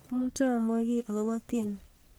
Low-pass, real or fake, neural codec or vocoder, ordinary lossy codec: none; fake; codec, 44.1 kHz, 1.7 kbps, Pupu-Codec; none